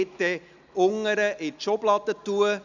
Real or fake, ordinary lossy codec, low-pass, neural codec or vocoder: real; none; 7.2 kHz; none